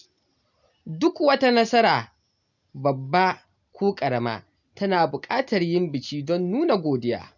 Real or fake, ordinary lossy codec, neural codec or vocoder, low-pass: real; none; none; 7.2 kHz